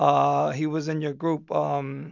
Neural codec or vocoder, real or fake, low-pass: none; real; 7.2 kHz